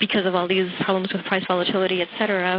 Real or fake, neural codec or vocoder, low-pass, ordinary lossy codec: real; none; 5.4 kHz; AAC, 24 kbps